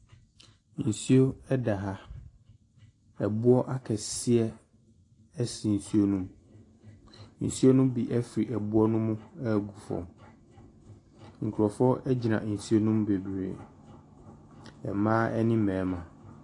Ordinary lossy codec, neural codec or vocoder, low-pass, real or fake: AAC, 48 kbps; none; 10.8 kHz; real